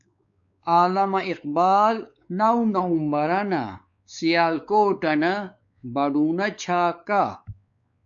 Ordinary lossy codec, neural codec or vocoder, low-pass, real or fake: MP3, 96 kbps; codec, 16 kHz, 4 kbps, X-Codec, WavLM features, trained on Multilingual LibriSpeech; 7.2 kHz; fake